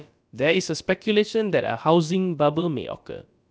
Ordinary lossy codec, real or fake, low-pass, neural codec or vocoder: none; fake; none; codec, 16 kHz, about 1 kbps, DyCAST, with the encoder's durations